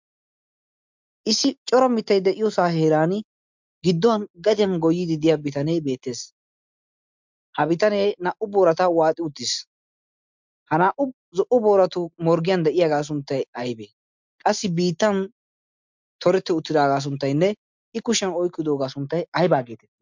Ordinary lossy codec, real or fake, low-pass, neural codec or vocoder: MP3, 64 kbps; real; 7.2 kHz; none